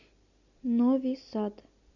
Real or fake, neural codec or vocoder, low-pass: real; none; 7.2 kHz